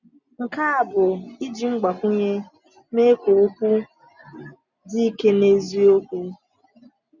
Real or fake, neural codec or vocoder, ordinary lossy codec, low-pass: real; none; none; 7.2 kHz